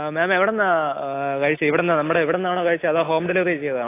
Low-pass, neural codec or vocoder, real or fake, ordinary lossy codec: 3.6 kHz; none; real; AAC, 24 kbps